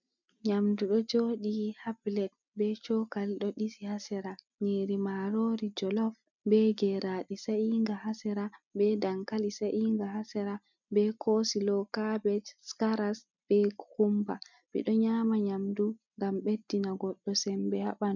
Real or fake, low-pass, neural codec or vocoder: real; 7.2 kHz; none